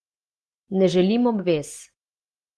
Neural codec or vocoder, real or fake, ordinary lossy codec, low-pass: none; real; Opus, 16 kbps; 10.8 kHz